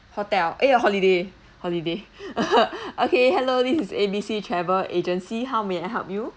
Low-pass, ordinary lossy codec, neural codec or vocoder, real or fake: none; none; none; real